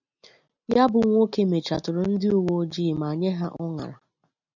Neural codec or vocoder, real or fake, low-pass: none; real; 7.2 kHz